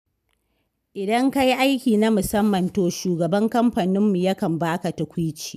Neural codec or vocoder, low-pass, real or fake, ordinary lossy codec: none; 14.4 kHz; real; none